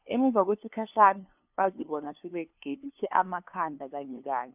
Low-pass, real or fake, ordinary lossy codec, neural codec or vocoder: 3.6 kHz; fake; none; codec, 16 kHz, 2 kbps, FunCodec, trained on LibriTTS, 25 frames a second